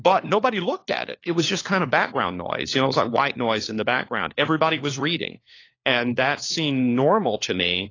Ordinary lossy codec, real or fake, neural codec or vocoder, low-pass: AAC, 32 kbps; fake; codec, 16 kHz, 4 kbps, FunCodec, trained on LibriTTS, 50 frames a second; 7.2 kHz